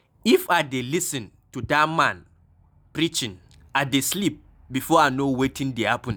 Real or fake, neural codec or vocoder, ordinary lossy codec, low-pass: real; none; none; none